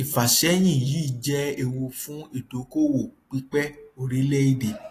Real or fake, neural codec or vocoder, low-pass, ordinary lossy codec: real; none; 14.4 kHz; AAC, 48 kbps